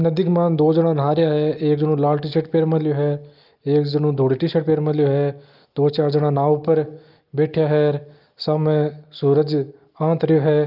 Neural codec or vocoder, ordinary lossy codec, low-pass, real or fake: none; Opus, 24 kbps; 5.4 kHz; real